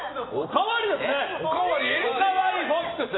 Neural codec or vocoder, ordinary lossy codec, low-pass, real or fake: none; AAC, 16 kbps; 7.2 kHz; real